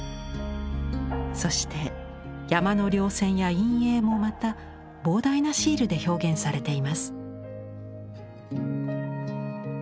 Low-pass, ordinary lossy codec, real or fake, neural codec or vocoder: none; none; real; none